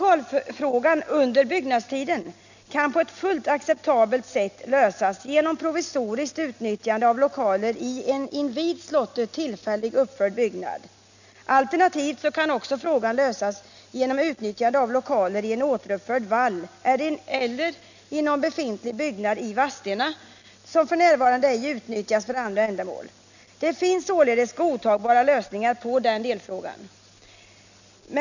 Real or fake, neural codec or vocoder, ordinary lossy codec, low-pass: real; none; none; 7.2 kHz